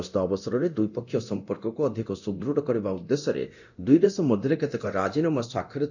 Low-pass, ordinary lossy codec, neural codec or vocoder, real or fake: 7.2 kHz; none; codec, 24 kHz, 0.9 kbps, DualCodec; fake